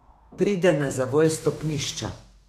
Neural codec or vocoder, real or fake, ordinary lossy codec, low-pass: codec, 32 kHz, 1.9 kbps, SNAC; fake; none; 14.4 kHz